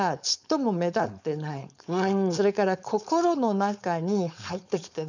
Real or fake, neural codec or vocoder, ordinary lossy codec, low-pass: fake; codec, 16 kHz, 4.8 kbps, FACodec; none; 7.2 kHz